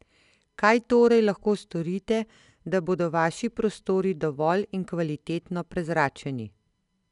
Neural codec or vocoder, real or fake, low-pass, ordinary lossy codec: none; real; 10.8 kHz; none